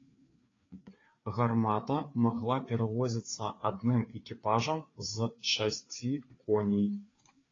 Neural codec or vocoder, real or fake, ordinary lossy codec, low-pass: codec, 16 kHz, 4 kbps, FreqCodec, larger model; fake; AAC, 32 kbps; 7.2 kHz